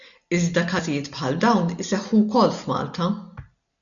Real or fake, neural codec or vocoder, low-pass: real; none; 7.2 kHz